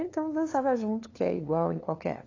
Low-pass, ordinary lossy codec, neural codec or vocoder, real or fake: 7.2 kHz; AAC, 32 kbps; codec, 16 kHz, 2 kbps, FunCodec, trained on LibriTTS, 25 frames a second; fake